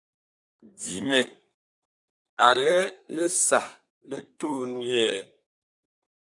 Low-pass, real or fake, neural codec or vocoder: 10.8 kHz; fake; codec, 24 kHz, 1 kbps, SNAC